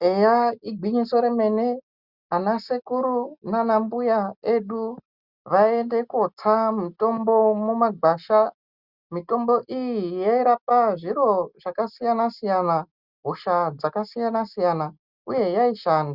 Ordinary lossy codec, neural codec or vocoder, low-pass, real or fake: Opus, 64 kbps; none; 5.4 kHz; real